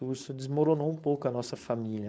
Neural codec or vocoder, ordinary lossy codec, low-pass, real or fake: codec, 16 kHz, 4.8 kbps, FACodec; none; none; fake